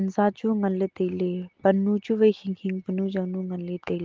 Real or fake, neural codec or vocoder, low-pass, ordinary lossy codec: real; none; 7.2 kHz; Opus, 16 kbps